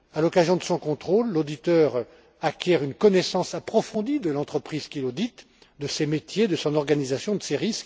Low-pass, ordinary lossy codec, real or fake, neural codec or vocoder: none; none; real; none